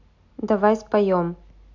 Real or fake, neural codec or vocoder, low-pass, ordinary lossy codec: real; none; 7.2 kHz; AAC, 48 kbps